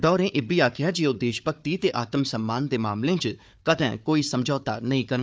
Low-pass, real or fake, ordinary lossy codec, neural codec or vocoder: none; fake; none; codec, 16 kHz, 4 kbps, FunCodec, trained on Chinese and English, 50 frames a second